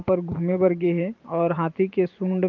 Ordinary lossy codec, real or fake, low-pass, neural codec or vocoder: Opus, 24 kbps; fake; 7.2 kHz; vocoder, 44.1 kHz, 128 mel bands every 512 samples, BigVGAN v2